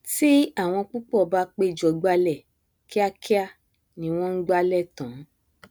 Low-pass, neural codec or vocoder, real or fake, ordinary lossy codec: none; none; real; none